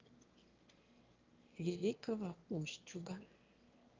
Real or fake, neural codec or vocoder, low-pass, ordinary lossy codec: fake; autoencoder, 22.05 kHz, a latent of 192 numbers a frame, VITS, trained on one speaker; 7.2 kHz; Opus, 24 kbps